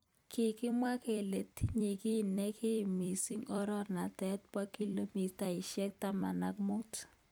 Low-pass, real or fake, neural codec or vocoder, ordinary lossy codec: none; fake; vocoder, 44.1 kHz, 128 mel bands every 256 samples, BigVGAN v2; none